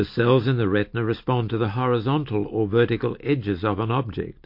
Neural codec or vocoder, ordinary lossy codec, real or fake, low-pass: none; MP3, 32 kbps; real; 5.4 kHz